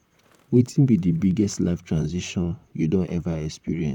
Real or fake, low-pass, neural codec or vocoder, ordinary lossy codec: fake; 19.8 kHz; vocoder, 44.1 kHz, 128 mel bands, Pupu-Vocoder; none